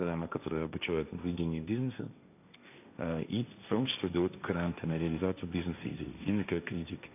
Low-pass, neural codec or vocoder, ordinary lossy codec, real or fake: 3.6 kHz; codec, 16 kHz, 1.1 kbps, Voila-Tokenizer; none; fake